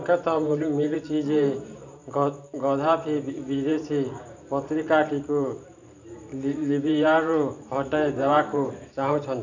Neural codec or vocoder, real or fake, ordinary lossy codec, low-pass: vocoder, 44.1 kHz, 128 mel bands every 512 samples, BigVGAN v2; fake; none; 7.2 kHz